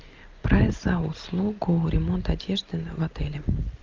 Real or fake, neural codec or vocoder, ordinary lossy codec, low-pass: real; none; Opus, 24 kbps; 7.2 kHz